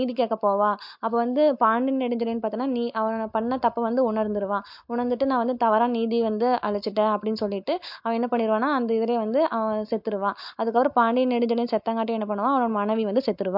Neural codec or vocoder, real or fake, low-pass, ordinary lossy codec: none; real; 5.4 kHz; MP3, 48 kbps